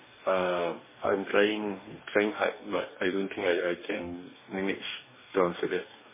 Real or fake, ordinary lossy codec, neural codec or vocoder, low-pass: fake; MP3, 16 kbps; codec, 44.1 kHz, 2.6 kbps, DAC; 3.6 kHz